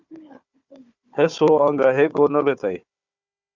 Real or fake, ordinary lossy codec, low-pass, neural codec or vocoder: fake; Opus, 64 kbps; 7.2 kHz; codec, 16 kHz, 4 kbps, FunCodec, trained on Chinese and English, 50 frames a second